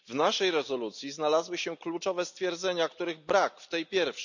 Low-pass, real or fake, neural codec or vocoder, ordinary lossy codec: 7.2 kHz; real; none; none